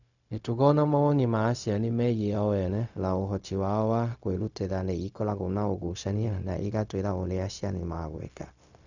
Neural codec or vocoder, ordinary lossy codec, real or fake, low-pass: codec, 16 kHz, 0.4 kbps, LongCat-Audio-Codec; none; fake; 7.2 kHz